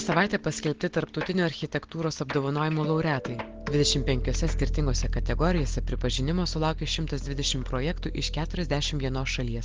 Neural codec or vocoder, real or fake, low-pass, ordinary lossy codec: none; real; 7.2 kHz; Opus, 16 kbps